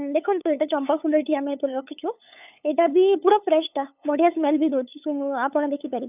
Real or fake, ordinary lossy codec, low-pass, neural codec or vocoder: fake; none; 3.6 kHz; codec, 16 kHz, 8 kbps, FunCodec, trained on LibriTTS, 25 frames a second